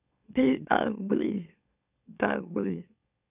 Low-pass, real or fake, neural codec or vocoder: 3.6 kHz; fake; autoencoder, 44.1 kHz, a latent of 192 numbers a frame, MeloTTS